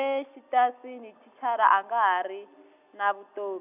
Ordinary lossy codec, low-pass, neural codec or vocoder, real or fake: none; 3.6 kHz; none; real